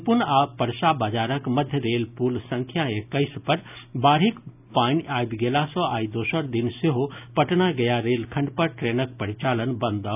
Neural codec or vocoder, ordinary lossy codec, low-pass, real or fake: none; none; 3.6 kHz; real